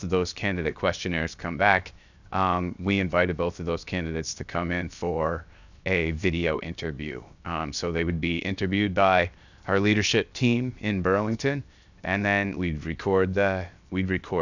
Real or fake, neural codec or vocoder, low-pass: fake; codec, 16 kHz, 0.7 kbps, FocalCodec; 7.2 kHz